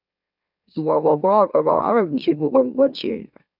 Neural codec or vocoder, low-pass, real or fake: autoencoder, 44.1 kHz, a latent of 192 numbers a frame, MeloTTS; 5.4 kHz; fake